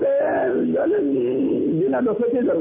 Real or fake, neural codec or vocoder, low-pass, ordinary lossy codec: real; none; 3.6 kHz; MP3, 16 kbps